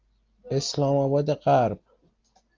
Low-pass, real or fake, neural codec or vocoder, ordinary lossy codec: 7.2 kHz; real; none; Opus, 32 kbps